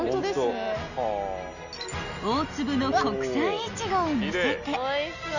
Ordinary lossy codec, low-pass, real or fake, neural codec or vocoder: none; 7.2 kHz; real; none